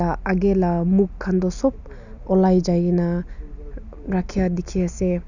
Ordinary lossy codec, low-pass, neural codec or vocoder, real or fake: none; 7.2 kHz; none; real